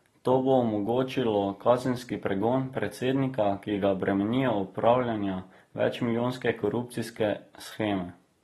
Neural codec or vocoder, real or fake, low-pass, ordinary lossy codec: none; real; 19.8 kHz; AAC, 32 kbps